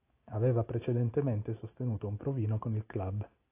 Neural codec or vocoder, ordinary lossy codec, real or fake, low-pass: none; AAC, 24 kbps; real; 3.6 kHz